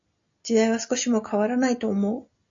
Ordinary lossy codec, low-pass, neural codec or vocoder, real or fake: AAC, 64 kbps; 7.2 kHz; none; real